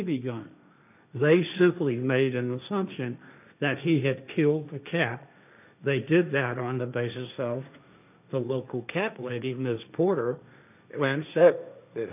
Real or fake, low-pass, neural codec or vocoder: fake; 3.6 kHz; codec, 16 kHz, 1.1 kbps, Voila-Tokenizer